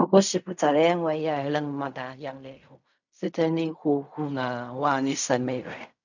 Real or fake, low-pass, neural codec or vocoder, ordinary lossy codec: fake; 7.2 kHz; codec, 16 kHz in and 24 kHz out, 0.4 kbps, LongCat-Audio-Codec, fine tuned four codebook decoder; none